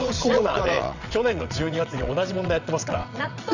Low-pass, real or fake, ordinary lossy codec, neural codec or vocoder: 7.2 kHz; fake; none; vocoder, 22.05 kHz, 80 mel bands, WaveNeXt